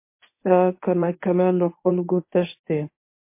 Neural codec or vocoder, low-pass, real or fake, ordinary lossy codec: codec, 24 kHz, 0.9 kbps, WavTokenizer, medium speech release version 1; 3.6 kHz; fake; MP3, 24 kbps